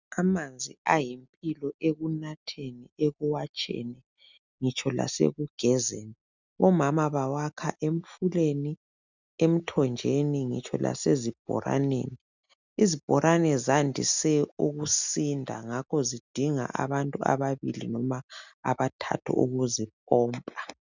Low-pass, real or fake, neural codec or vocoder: 7.2 kHz; real; none